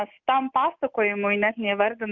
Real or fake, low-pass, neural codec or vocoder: real; 7.2 kHz; none